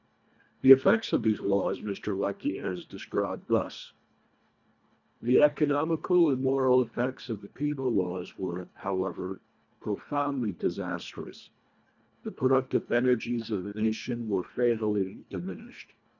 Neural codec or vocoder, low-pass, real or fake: codec, 24 kHz, 1.5 kbps, HILCodec; 7.2 kHz; fake